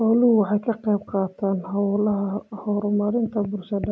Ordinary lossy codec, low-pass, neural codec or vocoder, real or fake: none; none; none; real